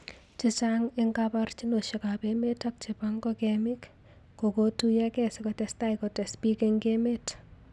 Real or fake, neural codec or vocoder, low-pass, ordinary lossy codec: real; none; none; none